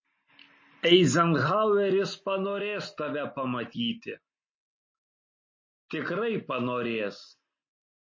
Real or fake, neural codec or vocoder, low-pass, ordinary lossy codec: real; none; 7.2 kHz; MP3, 32 kbps